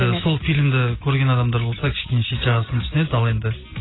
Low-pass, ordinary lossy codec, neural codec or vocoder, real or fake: 7.2 kHz; AAC, 16 kbps; none; real